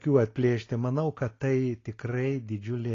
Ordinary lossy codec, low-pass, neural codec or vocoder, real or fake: AAC, 32 kbps; 7.2 kHz; none; real